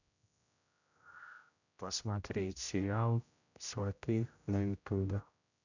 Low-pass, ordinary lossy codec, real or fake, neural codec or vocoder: 7.2 kHz; none; fake; codec, 16 kHz, 0.5 kbps, X-Codec, HuBERT features, trained on general audio